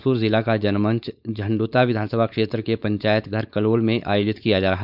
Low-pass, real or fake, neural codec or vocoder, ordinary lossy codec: 5.4 kHz; fake; codec, 16 kHz, 4.8 kbps, FACodec; none